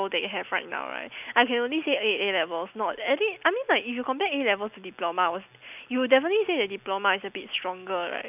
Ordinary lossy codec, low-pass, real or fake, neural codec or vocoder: none; 3.6 kHz; real; none